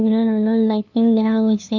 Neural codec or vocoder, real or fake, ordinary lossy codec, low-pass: codec, 16 kHz, 2 kbps, FunCodec, trained on LibriTTS, 25 frames a second; fake; none; 7.2 kHz